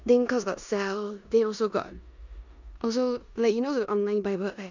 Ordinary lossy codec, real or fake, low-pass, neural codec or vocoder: none; fake; 7.2 kHz; codec, 16 kHz in and 24 kHz out, 0.9 kbps, LongCat-Audio-Codec, four codebook decoder